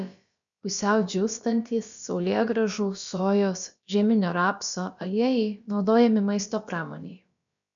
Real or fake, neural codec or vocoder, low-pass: fake; codec, 16 kHz, about 1 kbps, DyCAST, with the encoder's durations; 7.2 kHz